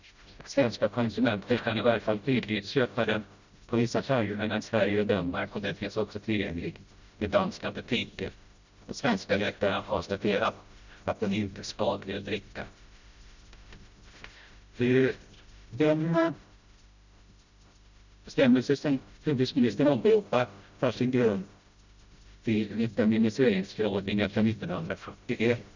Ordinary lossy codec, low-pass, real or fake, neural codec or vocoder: Opus, 64 kbps; 7.2 kHz; fake; codec, 16 kHz, 0.5 kbps, FreqCodec, smaller model